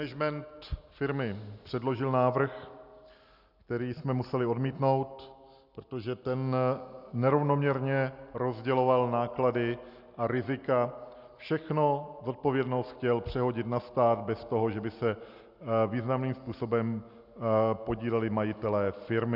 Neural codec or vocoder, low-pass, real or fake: none; 5.4 kHz; real